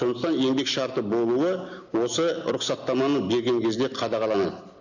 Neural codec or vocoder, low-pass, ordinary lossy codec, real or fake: none; 7.2 kHz; none; real